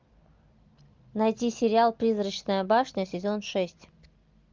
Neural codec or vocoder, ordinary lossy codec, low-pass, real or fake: none; Opus, 24 kbps; 7.2 kHz; real